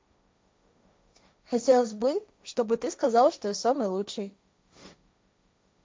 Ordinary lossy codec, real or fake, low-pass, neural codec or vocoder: none; fake; none; codec, 16 kHz, 1.1 kbps, Voila-Tokenizer